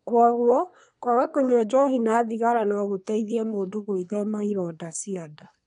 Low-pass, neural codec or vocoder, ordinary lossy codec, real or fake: 10.8 kHz; codec, 24 kHz, 1 kbps, SNAC; none; fake